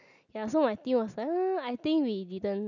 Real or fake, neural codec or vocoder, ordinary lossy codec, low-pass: real; none; none; 7.2 kHz